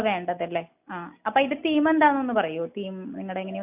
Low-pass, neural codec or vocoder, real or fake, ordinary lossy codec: 3.6 kHz; none; real; none